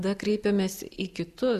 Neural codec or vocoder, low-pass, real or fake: none; 14.4 kHz; real